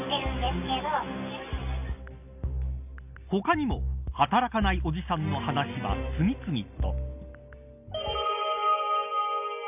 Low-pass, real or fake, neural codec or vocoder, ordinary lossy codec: 3.6 kHz; real; none; none